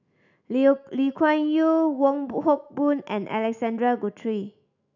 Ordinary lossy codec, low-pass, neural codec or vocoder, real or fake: none; 7.2 kHz; autoencoder, 48 kHz, 128 numbers a frame, DAC-VAE, trained on Japanese speech; fake